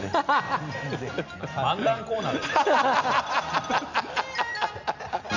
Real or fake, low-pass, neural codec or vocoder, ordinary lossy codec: real; 7.2 kHz; none; none